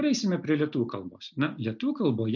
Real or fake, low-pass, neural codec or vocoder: real; 7.2 kHz; none